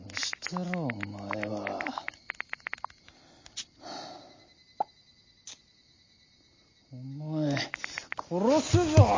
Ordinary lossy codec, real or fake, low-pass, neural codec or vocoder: MP3, 32 kbps; real; 7.2 kHz; none